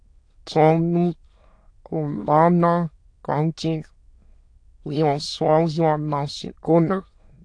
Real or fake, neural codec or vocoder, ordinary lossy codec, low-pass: fake; autoencoder, 22.05 kHz, a latent of 192 numbers a frame, VITS, trained on many speakers; AAC, 48 kbps; 9.9 kHz